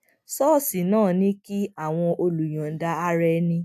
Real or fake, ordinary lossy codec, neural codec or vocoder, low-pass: real; AAC, 96 kbps; none; 14.4 kHz